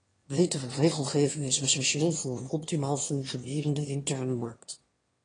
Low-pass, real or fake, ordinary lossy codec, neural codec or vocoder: 9.9 kHz; fake; AAC, 32 kbps; autoencoder, 22.05 kHz, a latent of 192 numbers a frame, VITS, trained on one speaker